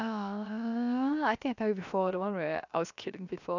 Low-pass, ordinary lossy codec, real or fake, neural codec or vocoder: 7.2 kHz; none; fake; codec, 16 kHz, 0.7 kbps, FocalCodec